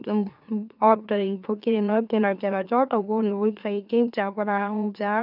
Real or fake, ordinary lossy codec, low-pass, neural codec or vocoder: fake; none; 5.4 kHz; autoencoder, 44.1 kHz, a latent of 192 numbers a frame, MeloTTS